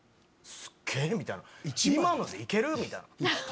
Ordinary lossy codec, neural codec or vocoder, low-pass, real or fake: none; none; none; real